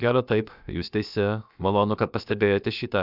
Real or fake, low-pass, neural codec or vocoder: fake; 5.4 kHz; codec, 16 kHz, about 1 kbps, DyCAST, with the encoder's durations